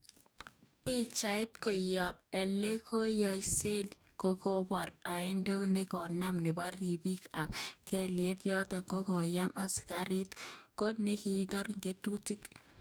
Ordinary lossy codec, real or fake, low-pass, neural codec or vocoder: none; fake; none; codec, 44.1 kHz, 2.6 kbps, DAC